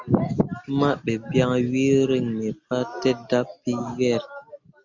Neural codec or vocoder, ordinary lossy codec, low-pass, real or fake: none; Opus, 64 kbps; 7.2 kHz; real